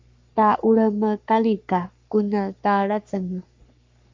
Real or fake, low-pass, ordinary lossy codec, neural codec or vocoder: fake; 7.2 kHz; MP3, 64 kbps; codec, 44.1 kHz, 3.4 kbps, Pupu-Codec